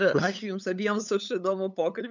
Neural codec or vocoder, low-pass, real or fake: codec, 16 kHz, 8 kbps, FunCodec, trained on LibriTTS, 25 frames a second; 7.2 kHz; fake